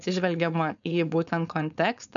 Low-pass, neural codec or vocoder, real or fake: 7.2 kHz; codec, 16 kHz, 4.8 kbps, FACodec; fake